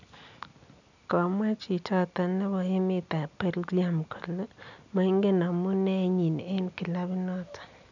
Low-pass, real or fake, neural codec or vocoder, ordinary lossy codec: 7.2 kHz; real; none; none